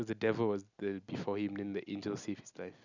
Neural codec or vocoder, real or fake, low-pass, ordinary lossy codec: none; real; 7.2 kHz; none